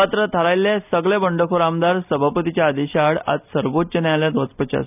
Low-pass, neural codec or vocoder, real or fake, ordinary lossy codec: 3.6 kHz; none; real; none